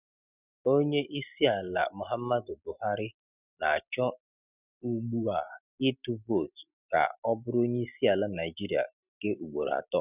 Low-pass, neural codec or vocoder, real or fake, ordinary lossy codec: 3.6 kHz; none; real; none